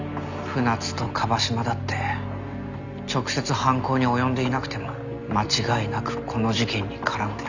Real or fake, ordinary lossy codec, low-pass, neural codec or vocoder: real; none; 7.2 kHz; none